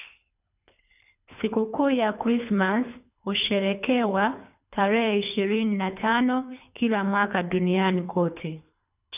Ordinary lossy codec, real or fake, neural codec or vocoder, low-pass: none; fake; codec, 24 kHz, 3 kbps, HILCodec; 3.6 kHz